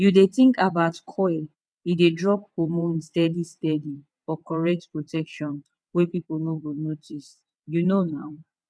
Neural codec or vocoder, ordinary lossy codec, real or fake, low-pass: vocoder, 22.05 kHz, 80 mel bands, WaveNeXt; none; fake; none